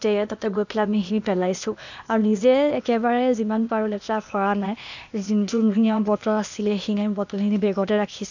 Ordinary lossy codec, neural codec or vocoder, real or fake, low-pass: none; codec, 16 kHz, 0.8 kbps, ZipCodec; fake; 7.2 kHz